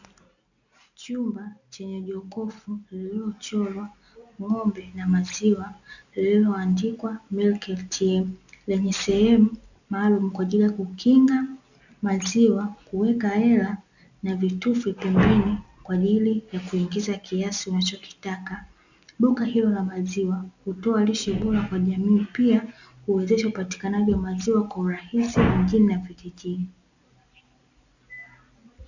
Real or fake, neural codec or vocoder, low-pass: real; none; 7.2 kHz